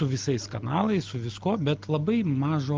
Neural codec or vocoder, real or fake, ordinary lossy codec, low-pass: none; real; Opus, 24 kbps; 7.2 kHz